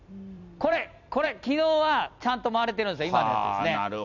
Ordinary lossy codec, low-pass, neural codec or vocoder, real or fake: none; 7.2 kHz; vocoder, 44.1 kHz, 128 mel bands every 256 samples, BigVGAN v2; fake